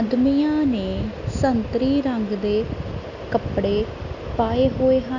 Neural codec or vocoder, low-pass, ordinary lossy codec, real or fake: none; 7.2 kHz; none; real